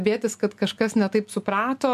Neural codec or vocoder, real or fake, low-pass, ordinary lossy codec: none; real; 14.4 kHz; MP3, 96 kbps